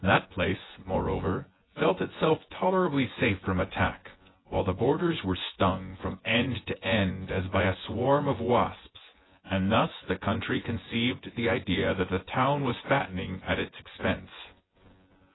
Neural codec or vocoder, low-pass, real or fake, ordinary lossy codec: vocoder, 24 kHz, 100 mel bands, Vocos; 7.2 kHz; fake; AAC, 16 kbps